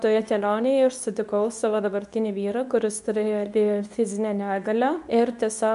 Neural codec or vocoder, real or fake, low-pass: codec, 24 kHz, 0.9 kbps, WavTokenizer, medium speech release version 2; fake; 10.8 kHz